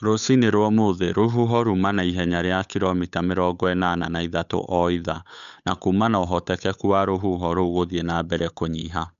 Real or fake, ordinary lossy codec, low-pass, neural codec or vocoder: fake; none; 7.2 kHz; codec, 16 kHz, 8 kbps, FunCodec, trained on Chinese and English, 25 frames a second